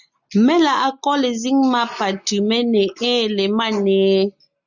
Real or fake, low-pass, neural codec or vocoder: real; 7.2 kHz; none